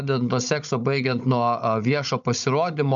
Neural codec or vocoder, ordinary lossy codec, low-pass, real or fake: codec, 16 kHz, 16 kbps, FunCodec, trained on Chinese and English, 50 frames a second; MP3, 96 kbps; 7.2 kHz; fake